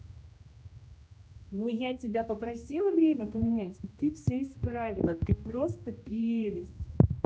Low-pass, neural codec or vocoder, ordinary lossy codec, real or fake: none; codec, 16 kHz, 1 kbps, X-Codec, HuBERT features, trained on general audio; none; fake